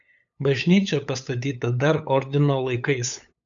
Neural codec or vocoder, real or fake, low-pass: codec, 16 kHz, 8 kbps, FunCodec, trained on LibriTTS, 25 frames a second; fake; 7.2 kHz